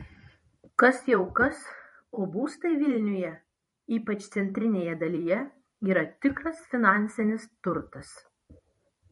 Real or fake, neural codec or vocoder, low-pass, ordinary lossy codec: fake; vocoder, 44.1 kHz, 128 mel bands every 512 samples, BigVGAN v2; 19.8 kHz; MP3, 48 kbps